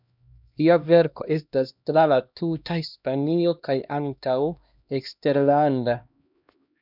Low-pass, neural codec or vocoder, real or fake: 5.4 kHz; codec, 16 kHz, 1 kbps, X-Codec, HuBERT features, trained on LibriSpeech; fake